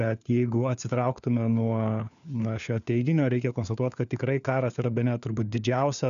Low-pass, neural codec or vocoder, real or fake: 7.2 kHz; codec, 16 kHz, 4 kbps, FunCodec, trained on LibriTTS, 50 frames a second; fake